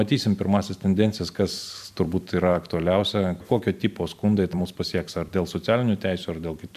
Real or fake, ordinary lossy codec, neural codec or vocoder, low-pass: fake; MP3, 96 kbps; vocoder, 44.1 kHz, 128 mel bands every 256 samples, BigVGAN v2; 14.4 kHz